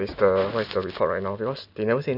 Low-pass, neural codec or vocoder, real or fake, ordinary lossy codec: 5.4 kHz; none; real; none